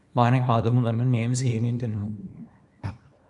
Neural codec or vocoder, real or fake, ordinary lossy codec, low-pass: codec, 24 kHz, 0.9 kbps, WavTokenizer, small release; fake; MP3, 64 kbps; 10.8 kHz